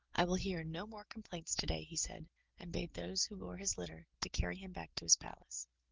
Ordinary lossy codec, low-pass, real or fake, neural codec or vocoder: Opus, 16 kbps; 7.2 kHz; real; none